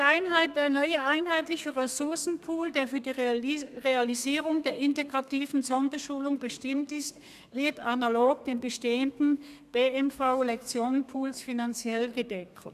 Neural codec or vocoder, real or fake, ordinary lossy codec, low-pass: codec, 32 kHz, 1.9 kbps, SNAC; fake; none; 14.4 kHz